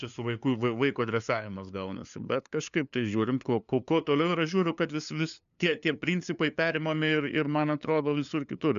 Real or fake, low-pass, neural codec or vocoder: fake; 7.2 kHz; codec, 16 kHz, 2 kbps, FunCodec, trained on LibriTTS, 25 frames a second